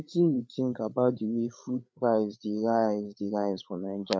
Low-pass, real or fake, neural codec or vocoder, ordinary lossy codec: none; fake; codec, 16 kHz, 8 kbps, FreqCodec, larger model; none